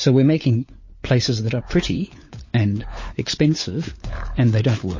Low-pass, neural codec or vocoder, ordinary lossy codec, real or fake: 7.2 kHz; codec, 16 kHz, 4 kbps, FunCodec, trained on LibriTTS, 50 frames a second; MP3, 32 kbps; fake